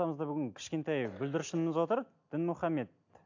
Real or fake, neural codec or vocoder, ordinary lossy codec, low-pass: real; none; AAC, 48 kbps; 7.2 kHz